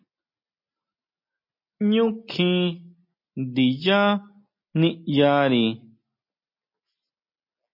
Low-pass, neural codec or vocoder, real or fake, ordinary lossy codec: 5.4 kHz; none; real; MP3, 32 kbps